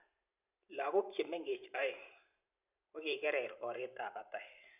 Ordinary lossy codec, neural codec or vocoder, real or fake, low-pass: none; vocoder, 44.1 kHz, 128 mel bands every 512 samples, BigVGAN v2; fake; 3.6 kHz